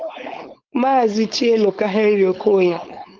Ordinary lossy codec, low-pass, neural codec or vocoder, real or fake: Opus, 32 kbps; 7.2 kHz; codec, 16 kHz, 4.8 kbps, FACodec; fake